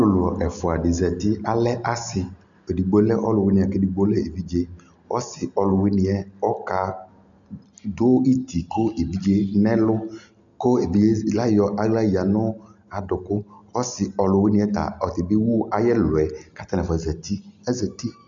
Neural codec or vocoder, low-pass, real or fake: none; 7.2 kHz; real